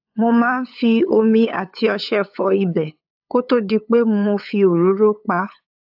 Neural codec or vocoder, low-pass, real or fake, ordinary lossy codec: codec, 16 kHz, 8 kbps, FunCodec, trained on LibriTTS, 25 frames a second; 5.4 kHz; fake; AAC, 48 kbps